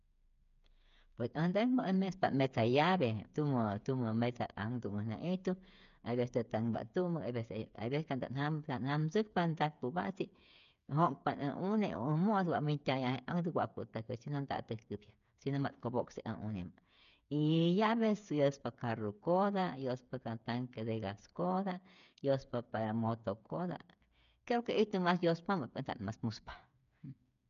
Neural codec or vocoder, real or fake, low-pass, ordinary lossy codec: codec, 16 kHz, 8 kbps, FreqCodec, smaller model; fake; 7.2 kHz; none